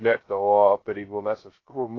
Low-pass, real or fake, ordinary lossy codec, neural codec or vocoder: 7.2 kHz; fake; AAC, 32 kbps; codec, 16 kHz, 0.2 kbps, FocalCodec